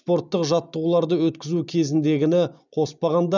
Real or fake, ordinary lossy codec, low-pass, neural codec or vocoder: real; none; 7.2 kHz; none